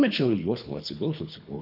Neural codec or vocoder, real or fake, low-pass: codec, 24 kHz, 3 kbps, HILCodec; fake; 5.4 kHz